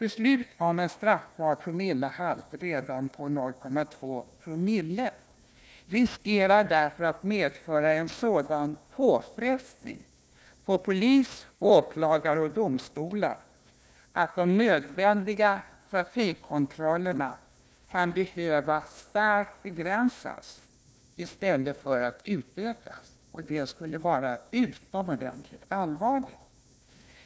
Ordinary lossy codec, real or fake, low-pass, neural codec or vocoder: none; fake; none; codec, 16 kHz, 1 kbps, FunCodec, trained on Chinese and English, 50 frames a second